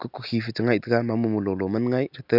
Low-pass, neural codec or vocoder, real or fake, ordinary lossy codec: 5.4 kHz; none; real; none